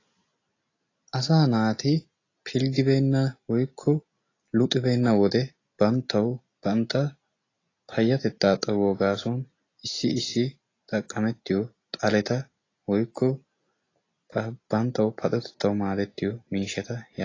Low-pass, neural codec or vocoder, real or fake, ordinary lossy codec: 7.2 kHz; none; real; AAC, 32 kbps